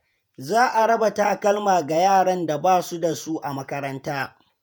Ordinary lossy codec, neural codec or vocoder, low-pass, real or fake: none; vocoder, 48 kHz, 128 mel bands, Vocos; none; fake